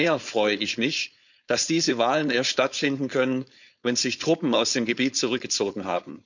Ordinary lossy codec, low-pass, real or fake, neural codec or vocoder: none; 7.2 kHz; fake; codec, 16 kHz, 4.8 kbps, FACodec